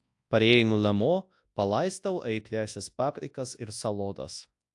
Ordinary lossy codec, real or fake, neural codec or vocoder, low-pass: AAC, 64 kbps; fake; codec, 24 kHz, 0.9 kbps, WavTokenizer, large speech release; 10.8 kHz